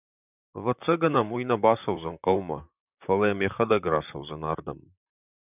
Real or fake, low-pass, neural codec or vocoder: fake; 3.6 kHz; vocoder, 44.1 kHz, 128 mel bands, Pupu-Vocoder